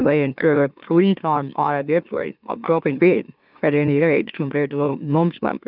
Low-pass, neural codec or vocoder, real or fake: 5.4 kHz; autoencoder, 44.1 kHz, a latent of 192 numbers a frame, MeloTTS; fake